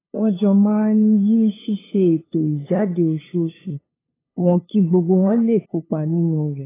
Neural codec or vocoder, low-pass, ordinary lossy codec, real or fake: codec, 16 kHz, 2 kbps, FunCodec, trained on LibriTTS, 25 frames a second; 3.6 kHz; AAC, 16 kbps; fake